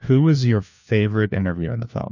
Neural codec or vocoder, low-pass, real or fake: codec, 16 kHz, 1 kbps, FunCodec, trained on LibriTTS, 50 frames a second; 7.2 kHz; fake